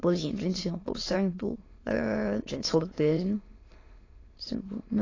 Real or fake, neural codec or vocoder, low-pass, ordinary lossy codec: fake; autoencoder, 22.05 kHz, a latent of 192 numbers a frame, VITS, trained on many speakers; 7.2 kHz; AAC, 32 kbps